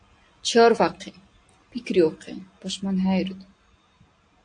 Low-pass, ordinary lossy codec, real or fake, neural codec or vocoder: 9.9 kHz; AAC, 48 kbps; real; none